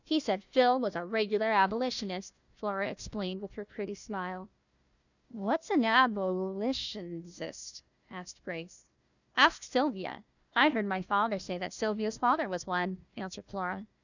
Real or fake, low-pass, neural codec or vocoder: fake; 7.2 kHz; codec, 16 kHz, 1 kbps, FunCodec, trained on Chinese and English, 50 frames a second